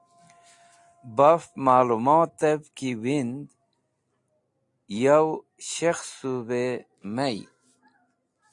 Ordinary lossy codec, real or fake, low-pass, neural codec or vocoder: AAC, 64 kbps; real; 10.8 kHz; none